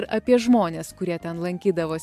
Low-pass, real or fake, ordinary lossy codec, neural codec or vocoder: 14.4 kHz; real; AAC, 96 kbps; none